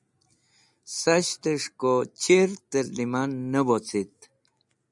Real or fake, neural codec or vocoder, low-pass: real; none; 10.8 kHz